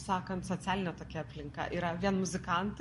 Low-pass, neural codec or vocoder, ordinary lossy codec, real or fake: 14.4 kHz; none; MP3, 48 kbps; real